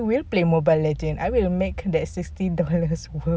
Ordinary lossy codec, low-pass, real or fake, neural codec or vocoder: none; none; real; none